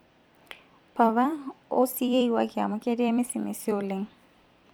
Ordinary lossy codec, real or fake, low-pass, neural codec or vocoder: none; fake; none; vocoder, 44.1 kHz, 128 mel bands every 256 samples, BigVGAN v2